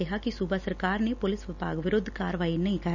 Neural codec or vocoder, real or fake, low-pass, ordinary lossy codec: none; real; 7.2 kHz; none